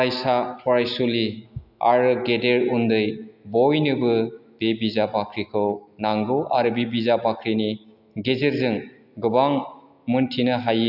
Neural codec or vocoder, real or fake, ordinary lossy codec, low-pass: none; real; AAC, 48 kbps; 5.4 kHz